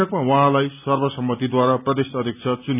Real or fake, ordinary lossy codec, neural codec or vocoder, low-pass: real; none; none; 3.6 kHz